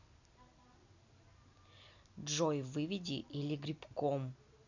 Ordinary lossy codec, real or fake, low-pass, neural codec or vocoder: none; real; 7.2 kHz; none